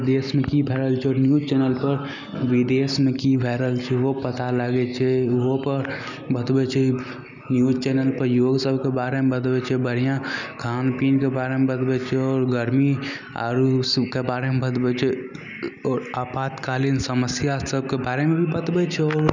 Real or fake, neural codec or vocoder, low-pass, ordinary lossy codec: real; none; 7.2 kHz; none